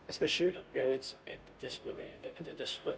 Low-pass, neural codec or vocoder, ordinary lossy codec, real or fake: none; codec, 16 kHz, 0.5 kbps, FunCodec, trained on Chinese and English, 25 frames a second; none; fake